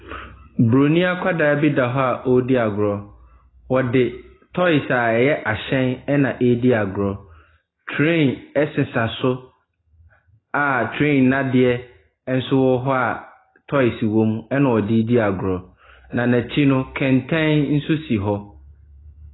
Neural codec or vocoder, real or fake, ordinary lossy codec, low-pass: none; real; AAC, 16 kbps; 7.2 kHz